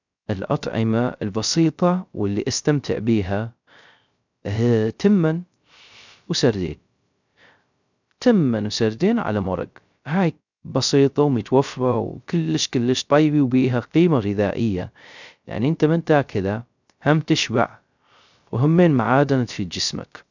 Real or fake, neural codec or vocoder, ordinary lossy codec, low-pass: fake; codec, 16 kHz, 0.3 kbps, FocalCodec; none; 7.2 kHz